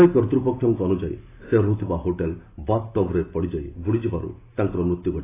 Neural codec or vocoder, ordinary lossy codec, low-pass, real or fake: none; AAC, 16 kbps; 3.6 kHz; real